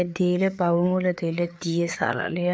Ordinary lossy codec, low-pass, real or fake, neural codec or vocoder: none; none; fake; codec, 16 kHz, 4 kbps, FreqCodec, larger model